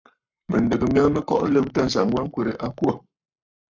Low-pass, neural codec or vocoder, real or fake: 7.2 kHz; codec, 44.1 kHz, 7.8 kbps, Pupu-Codec; fake